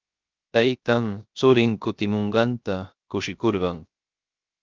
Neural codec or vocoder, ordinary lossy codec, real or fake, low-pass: codec, 16 kHz, 0.3 kbps, FocalCodec; Opus, 24 kbps; fake; 7.2 kHz